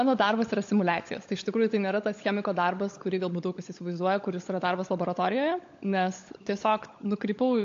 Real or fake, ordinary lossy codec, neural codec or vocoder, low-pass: fake; AAC, 48 kbps; codec, 16 kHz, 16 kbps, FunCodec, trained on LibriTTS, 50 frames a second; 7.2 kHz